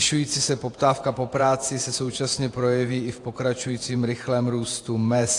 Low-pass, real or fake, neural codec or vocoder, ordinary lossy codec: 10.8 kHz; fake; vocoder, 48 kHz, 128 mel bands, Vocos; AAC, 48 kbps